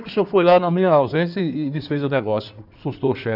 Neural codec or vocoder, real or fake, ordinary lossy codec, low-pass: codec, 16 kHz in and 24 kHz out, 2.2 kbps, FireRedTTS-2 codec; fake; none; 5.4 kHz